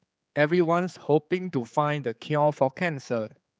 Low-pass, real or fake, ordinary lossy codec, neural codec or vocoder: none; fake; none; codec, 16 kHz, 4 kbps, X-Codec, HuBERT features, trained on general audio